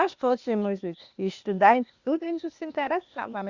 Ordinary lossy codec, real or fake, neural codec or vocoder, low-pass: Opus, 64 kbps; fake; codec, 16 kHz, 0.8 kbps, ZipCodec; 7.2 kHz